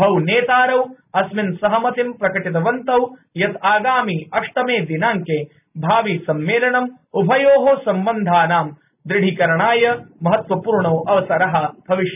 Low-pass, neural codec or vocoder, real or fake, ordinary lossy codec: 3.6 kHz; none; real; none